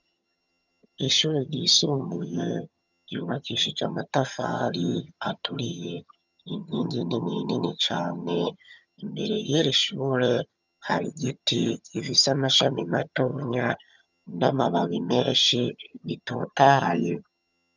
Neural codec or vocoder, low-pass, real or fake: vocoder, 22.05 kHz, 80 mel bands, HiFi-GAN; 7.2 kHz; fake